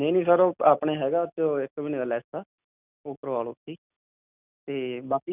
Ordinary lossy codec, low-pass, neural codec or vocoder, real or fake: none; 3.6 kHz; none; real